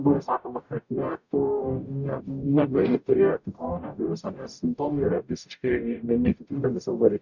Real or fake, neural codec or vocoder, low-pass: fake; codec, 44.1 kHz, 0.9 kbps, DAC; 7.2 kHz